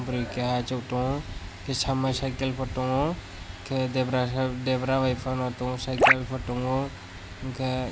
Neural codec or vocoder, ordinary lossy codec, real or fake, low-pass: none; none; real; none